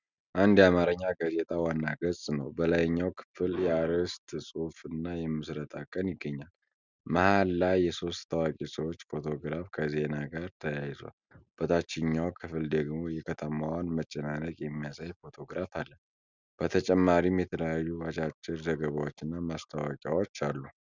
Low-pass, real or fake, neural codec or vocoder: 7.2 kHz; real; none